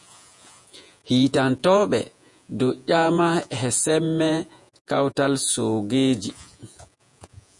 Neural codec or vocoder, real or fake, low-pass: vocoder, 48 kHz, 128 mel bands, Vocos; fake; 10.8 kHz